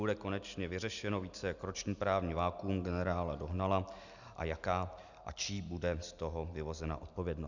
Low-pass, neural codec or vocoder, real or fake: 7.2 kHz; none; real